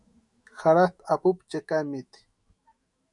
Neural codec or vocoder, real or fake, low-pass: autoencoder, 48 kHz, 128 numbers a frame, DAC-VAE, trained on Japanese speech; fake; 10.8 kHz